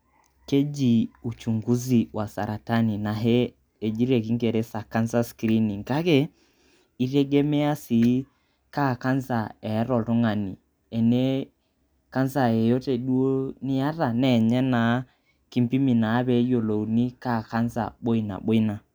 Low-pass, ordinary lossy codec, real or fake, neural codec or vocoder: none; none; real; none